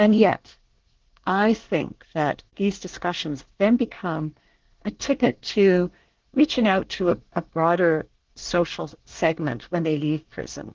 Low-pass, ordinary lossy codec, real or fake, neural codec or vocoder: 7.2 kHz; Opus, 16 kbps; fake; codec, 24 kHz, 1 kbps, SNAC